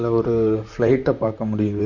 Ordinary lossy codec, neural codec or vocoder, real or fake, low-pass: none; vocoder, 44.1 kHz, 128 mel bands, Pupu-Vocoder; fake; 7.2 kHz